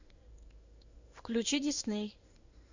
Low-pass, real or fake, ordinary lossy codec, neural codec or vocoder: 7.2 kHz; fake; Opus, 64 kbps; codec, 16 kHz in and 24 kHz out, 1 kbps, XY-Tokenizer